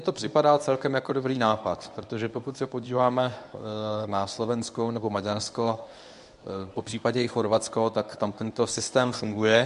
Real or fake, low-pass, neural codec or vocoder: fake; 10.8 kHz; codec, 24 kHz, 0.9 kbps, WavTokenizer, medium speech release version 1